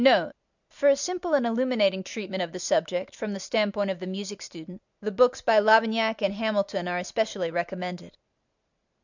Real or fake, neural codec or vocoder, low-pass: real; none; 7.2 kHz